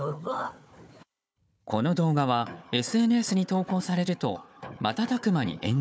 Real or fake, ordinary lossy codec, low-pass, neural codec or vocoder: fake; none; none; codec, 16 kHz, 4 kbps, FunCodec, trained on Chinese and English, 50 frames a second